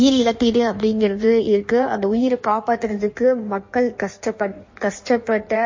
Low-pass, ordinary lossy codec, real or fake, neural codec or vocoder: 7.2 kHz; MP3, 32 kbps; fake; codec, 16 kHz in and 24 kHz out, 1.1 kbps, FireRedTTS-2 codec